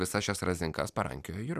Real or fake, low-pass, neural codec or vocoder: real; 14.4 kHz; none